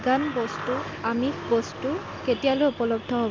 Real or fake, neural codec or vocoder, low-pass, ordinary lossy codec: real; none; 7.2 kHz; Opus, 32 kbps